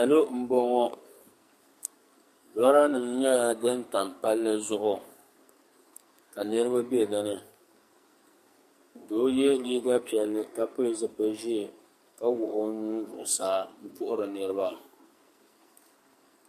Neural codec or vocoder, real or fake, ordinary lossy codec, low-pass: codec, 44.1 kHz, 2.6 kbps, SNAC; fake; MP3, 64 kbps; 14.4 kHz